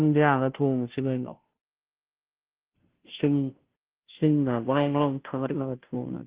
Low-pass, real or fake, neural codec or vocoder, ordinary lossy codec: 3.6 kHz; fake; codec, 16 kHz, 0.5 kbps, FunCodec, trained on Chinese and English, 25 frames a second; Opus, 16 kbps